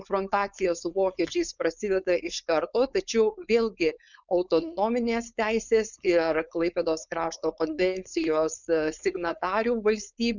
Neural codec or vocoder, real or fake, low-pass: codec, 16 kHz, 4.8 kbps, FACodec; fake; 7.2 kHz